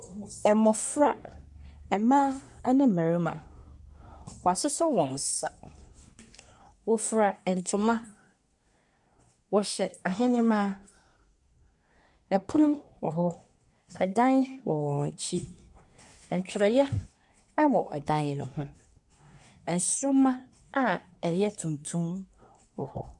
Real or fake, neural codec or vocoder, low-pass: fake; codec, 24 kHz, 1 kbps, SNAC; 10.8 kHz